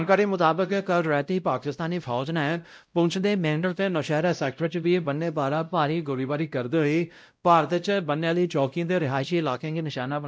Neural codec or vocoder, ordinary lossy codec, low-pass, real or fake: codec, 16 kHz, 0.5 kbps, X-Codec, WavLM features, trained on Multilingual LibriSpeech; none; none; fake